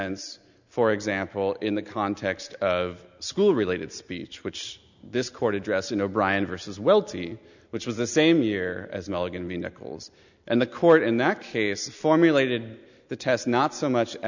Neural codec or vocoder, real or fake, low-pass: none; real; 7.2 kHz